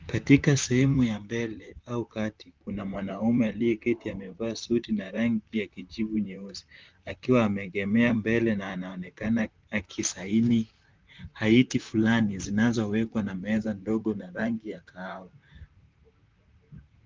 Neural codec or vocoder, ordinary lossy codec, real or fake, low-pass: vocoder, 44.1 kHz, 128 mel bands, Pupu-Vocoder; Opus, 24 kbps; fake; 7.2 kHz